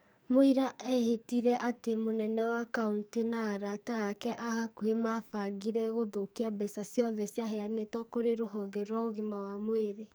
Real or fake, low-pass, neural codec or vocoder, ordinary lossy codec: fake; none; codec, 44.1 kHz, 2.6 kbps, SNAC; none